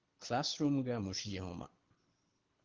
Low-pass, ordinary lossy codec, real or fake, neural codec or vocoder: 7.2 kHz; Opus, 16 kbps; fake; codec, 24 kHz, 6 kbps, HILCodec